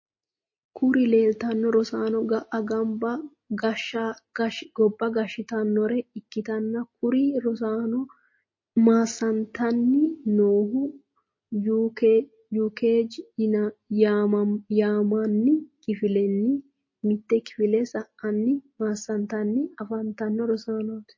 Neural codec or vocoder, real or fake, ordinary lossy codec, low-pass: none; real; MP3, 32 kbps; 7.2 kHz